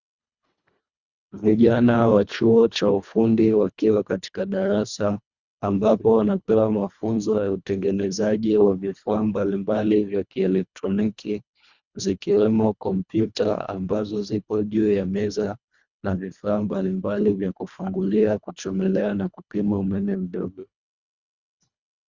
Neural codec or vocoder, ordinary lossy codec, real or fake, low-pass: codec, 24 kHz, 1.5 kbps, HILCodec; Opus, 64 kbps; fake; 7.2 kHz